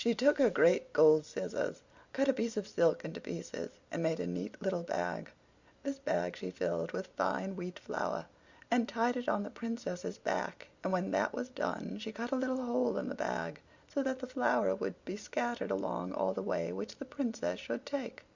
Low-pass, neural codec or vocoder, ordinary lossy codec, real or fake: 7.2 kHz; none; Opus, 64 kbps; real